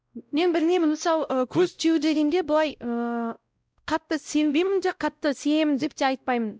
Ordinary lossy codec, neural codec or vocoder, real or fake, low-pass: none; codec, 16 kHz, 0.5 kbps, X-Codec, WavLM features, trained on Multilingual LibriSpeech; fake; none